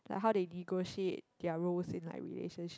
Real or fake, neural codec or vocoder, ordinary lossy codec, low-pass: real; none; none; none